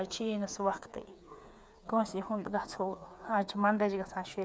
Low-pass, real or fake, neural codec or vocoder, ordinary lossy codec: none; fake; codec, 16 kHz, 8 kbps, FreqCodec, smaller model; none